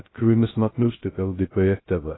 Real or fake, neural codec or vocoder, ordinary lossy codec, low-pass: fake; codec, 16 kHz, 0.2 kbps, FocalCodec; AAC, 16 kbps; 7.2 kHz